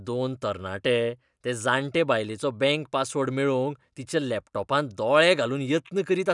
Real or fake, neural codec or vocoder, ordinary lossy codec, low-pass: real; none; none; 10.8 kHz